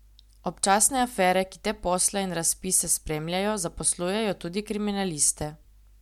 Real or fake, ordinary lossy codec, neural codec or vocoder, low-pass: real; MP3, 96 kbps; none; 19.8 kHz